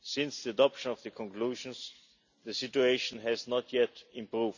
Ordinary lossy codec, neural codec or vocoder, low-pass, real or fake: none; none; 7.2 kHz; real